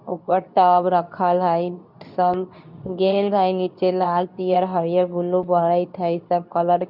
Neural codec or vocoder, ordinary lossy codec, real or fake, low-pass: codec, 24 kHz, 0.9 kbps, WavTokenizer, medium speech release version 2; none; fake; 5.4 kHz